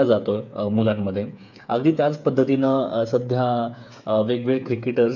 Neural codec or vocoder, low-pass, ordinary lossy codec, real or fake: codec, 16 kHz, 8 kbps, FreqCodec, smaller model; 7.2 kHz; none; fake